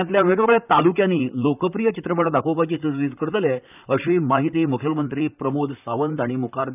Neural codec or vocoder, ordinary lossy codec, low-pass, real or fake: vocoder, 44.1 kHz, 128 mel bands, Pupu-Vocoder; none; 3.6 kHz; fake